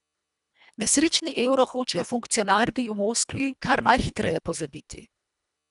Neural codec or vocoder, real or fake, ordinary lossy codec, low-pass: codec, 24 kHz, 1.5 kbps, HILCodec; fake; none; 10.8 kHz